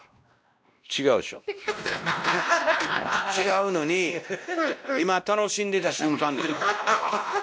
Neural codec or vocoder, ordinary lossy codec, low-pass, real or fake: codec, 16 kHz, 1 kbps, X-Codec, WavLM features, trained on Multilingual LibriSpeech; none; none; fake